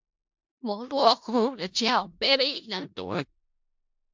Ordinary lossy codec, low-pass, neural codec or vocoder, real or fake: MP3, 64 kbps; 7.2 kHz; codec, 16 kHz in and 24 kHz out, 0.4 kbps, LongCat-Audio-Codec, four codebook decoder; fake